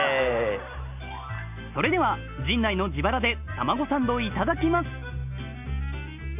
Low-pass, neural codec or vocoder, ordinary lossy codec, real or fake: 3.6 kHz; none; none; real